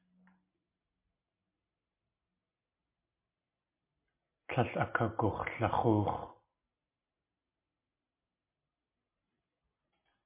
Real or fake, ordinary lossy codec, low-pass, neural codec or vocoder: real; MP3, 24 kbps; 3.6 kHz; none